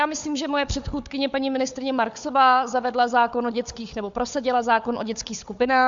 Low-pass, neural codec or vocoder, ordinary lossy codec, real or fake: 7.2 kHz; codec, 16 kHz, 4 kbps, FunCodec, trained on Chinese and English, 50 frames a second; MP3, 64 kbps; fake